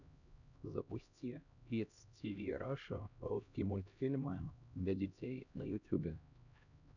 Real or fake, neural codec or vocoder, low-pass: fake; codec, 16 kHz, 1 kbps, X-Codec, HuBERT features, trained on LibriSpeech; 7.2 kHz